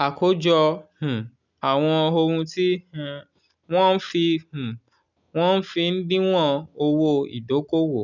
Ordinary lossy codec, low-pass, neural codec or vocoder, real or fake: none; 7.2 kHz; none; real